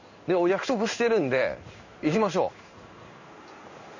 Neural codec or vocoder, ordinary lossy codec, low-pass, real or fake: codec, 16 kHz in and 24 kHz out, 1 kbps, XY-Tokenizer; none; 7.2 kHz; fake